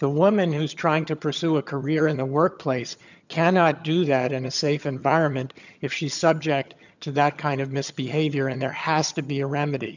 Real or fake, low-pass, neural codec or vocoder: fake; 7.2 kHz; vocoder, 22.05 kHz, 80 mel bands, HiFi-GAN